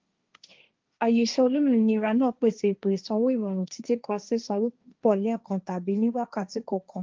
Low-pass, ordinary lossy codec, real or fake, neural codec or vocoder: 7.2 kHz; Opus, 24 kbps; fake; codec, 16 kHz, 1.1 kbps, Voila-Tokenizer